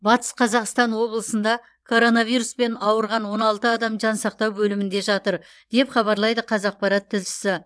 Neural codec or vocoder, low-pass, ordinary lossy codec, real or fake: vocoder, 22.05 kHz, 80 mel bands, WaveNeXt; none; none; fake